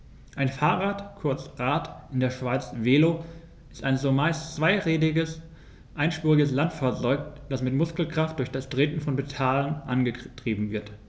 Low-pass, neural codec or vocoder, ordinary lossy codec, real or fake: none; none; none; real